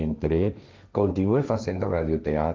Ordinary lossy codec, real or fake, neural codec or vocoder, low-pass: Opus, 24 kbps; fake; codec, 16 kHz, 1.1 kbps, Voila-Tokenizer; 7.2 kHz